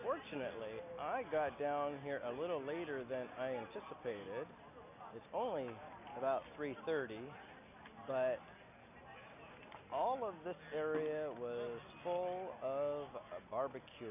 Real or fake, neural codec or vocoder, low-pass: real; none; 3.6 kHz